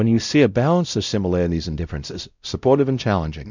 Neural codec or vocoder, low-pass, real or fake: codec, 16 kHz, 0.5 kbps, X-Codec, WavLM features, trained on Multilingual LibriSpeech; 7.2 kHz; fake